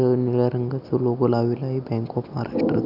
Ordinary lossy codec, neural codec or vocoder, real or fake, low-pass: none; none; real; 5.4 kHz